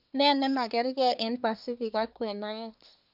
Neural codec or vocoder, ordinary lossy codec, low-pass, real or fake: codec, 24 kHz, 1 kbps, SNAC; none; 5.4 kHz; fake